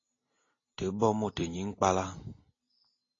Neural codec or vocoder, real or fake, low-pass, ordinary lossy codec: none; real; 7.2 kHz; AAC, 32 kbps